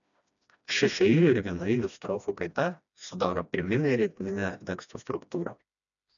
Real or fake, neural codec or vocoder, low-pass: fake; codec, 16 kHz, 1 kbps, FreqCodec, smaller model; 7.2 kHz